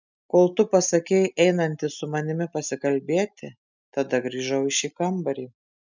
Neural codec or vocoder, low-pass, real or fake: none; 7.2 kHz; real